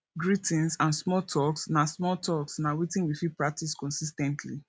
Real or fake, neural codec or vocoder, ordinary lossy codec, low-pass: real; none; none; none